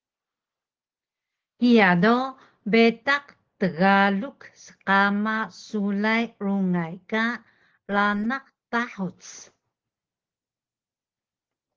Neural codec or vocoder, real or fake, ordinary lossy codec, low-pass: none; real; Opus, 16 kbps; 7.2 kHz